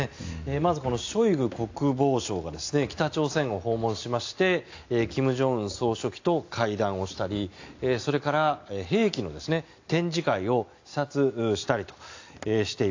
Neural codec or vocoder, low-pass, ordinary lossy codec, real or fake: none; 7.2 kHz; AAC, 48 kbps; real